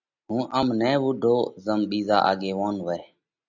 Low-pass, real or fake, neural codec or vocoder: 7.2 kHz; real; none